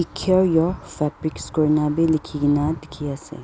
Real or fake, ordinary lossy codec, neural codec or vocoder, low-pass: real; none; none; none